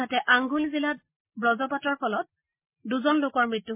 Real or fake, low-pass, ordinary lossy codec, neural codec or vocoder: real; 3.6 kHz; MP3, 24 kbps; none